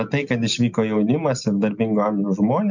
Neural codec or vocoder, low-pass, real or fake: none; 7.2 kHz; real